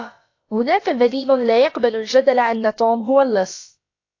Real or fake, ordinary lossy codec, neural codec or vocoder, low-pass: fake; AAC, 48 kbps; codec, 16 kHz, about 1 kbps, DyCAST, with the encoder's durations; 7.2 kHz